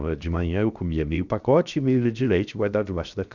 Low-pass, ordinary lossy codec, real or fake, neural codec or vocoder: 7.2 kHz; none; fake; codec, 16 kHz, 0.7 kbps, FocalCodec